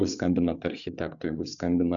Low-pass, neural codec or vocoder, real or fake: 7.2 kHz; codec, 16 kHz, 8 kbps, FreqCodec, larger model; fake